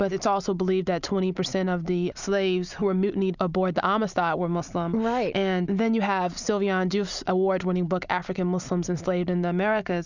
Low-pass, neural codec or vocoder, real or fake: 7.2 kHz; none; real